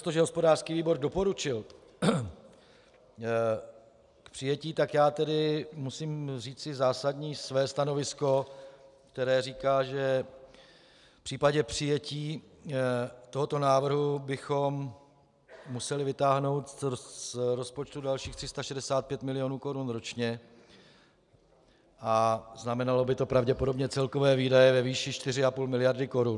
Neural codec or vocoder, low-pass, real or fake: none; 10.8 kHz; real